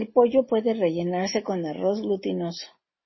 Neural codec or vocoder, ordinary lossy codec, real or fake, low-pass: none; MP3, 24 kbps; real; 7.2 kHz